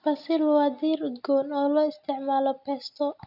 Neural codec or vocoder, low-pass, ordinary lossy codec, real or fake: none; 5.4 kHz; none; real